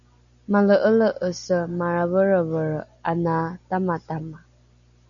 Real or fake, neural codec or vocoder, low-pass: real; none; 7.2 kHz